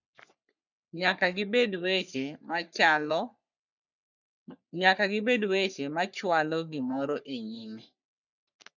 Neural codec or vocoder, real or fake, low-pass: codec, 44.1 kHz, 3.4 kbps, Pupu-Codec; fake; 7.2 kHz